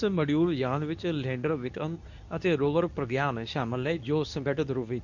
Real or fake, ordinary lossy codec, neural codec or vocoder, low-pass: fake; none; codec, 24 kHz, 0.9 kbps, WavTokenizer, medium speech release version 2; 7.2 kHz